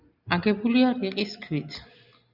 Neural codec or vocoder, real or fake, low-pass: none; real; 5.4 kHz